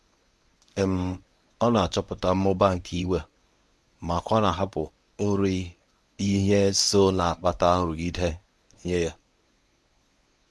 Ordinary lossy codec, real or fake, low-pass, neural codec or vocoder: none; fake; none; codec, 24 kHz, 0.9 kbps, WavTokenizer, medium speech release version 1